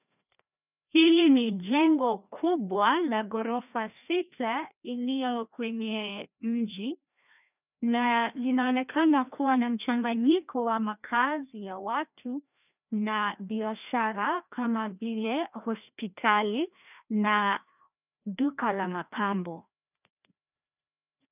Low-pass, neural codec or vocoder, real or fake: 3.6 kHz; codec, 16 kHz, 1 kbps, FreqCodec, larger model; fake